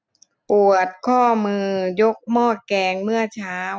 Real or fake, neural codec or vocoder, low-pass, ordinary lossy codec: real; none; none; none